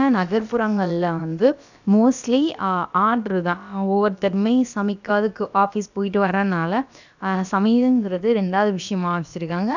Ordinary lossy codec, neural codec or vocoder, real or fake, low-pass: none; codec, 16 kHz, about 1 kbps, DyCAST, with the encoder's durations; fake; 7.2 kHz